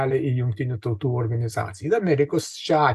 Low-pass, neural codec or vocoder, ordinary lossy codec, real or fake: 14.4 kHz; none; AAC, 96 kbps; real